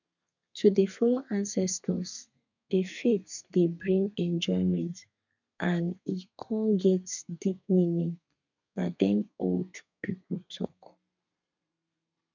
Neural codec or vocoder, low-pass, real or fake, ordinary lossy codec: codec, 32 kHz, 1.9 kbps, SNAC; 7.2 kHz; fake; none